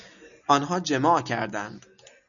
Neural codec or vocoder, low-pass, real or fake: none; 7.2 kHz; real